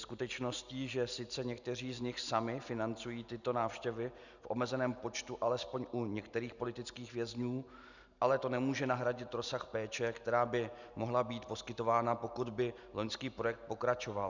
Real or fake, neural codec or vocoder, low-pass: real; none; 7.2 kHz